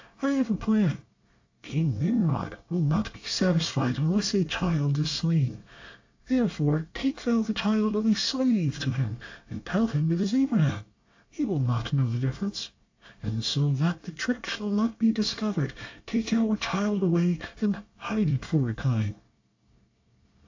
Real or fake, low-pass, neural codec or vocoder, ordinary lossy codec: fake; 7.2 kHz; codec, 24 kHz, 1 kbps, SNAC; AAC, 48 kbps